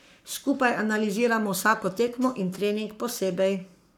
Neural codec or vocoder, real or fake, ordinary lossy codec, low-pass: codec, 44.1 kHz, 7.8 kbps, Pupu-Codec; fake; none; 19.8 kHz